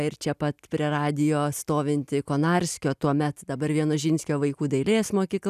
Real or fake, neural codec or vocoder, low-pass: real; none; 14.4 kHz